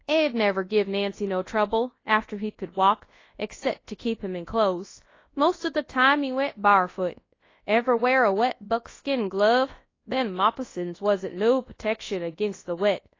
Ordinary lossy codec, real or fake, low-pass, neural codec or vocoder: AAC, 32 kbps; fake; 7.2 kHz; codec, 24 kHz, 0.9 kbps, WavTokenizer, large speech release